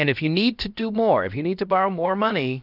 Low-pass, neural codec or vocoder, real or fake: 5.4 kHz; codec, 16 kHz, about 1 kbps, DyCAST, with the encoder's durations; fake